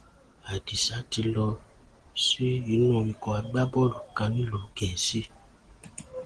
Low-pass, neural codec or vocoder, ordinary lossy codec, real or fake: 9.9 kHz; none; Opus, 16 kbps; real